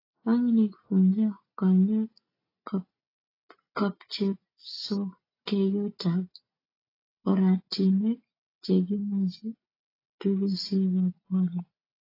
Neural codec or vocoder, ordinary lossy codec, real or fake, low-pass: none; AAC, 24 kbps; real; 5.4 kHz